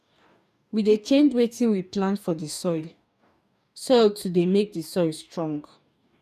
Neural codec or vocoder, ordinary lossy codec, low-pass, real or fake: codec, 44.1 kHz, 2.6 kbps, DAC; none; 14.4 kHz; fake